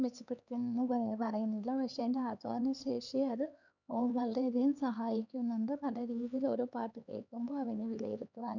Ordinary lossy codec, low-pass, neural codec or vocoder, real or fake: none; 7.2 kHz; codec, 16 kHz, 4 kbps, X-Codec, HuBERT features, trained on LibriSpeech; fake